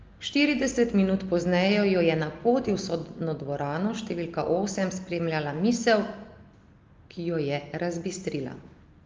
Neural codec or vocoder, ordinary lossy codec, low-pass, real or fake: none; Opus, 24 kbps; 7.2 kHz; real